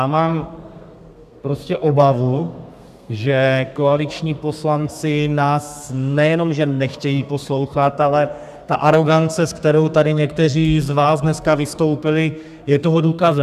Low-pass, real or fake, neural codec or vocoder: 14.4 kHz; fake; codec, 32 kHz, 1.9 kbps, SNAC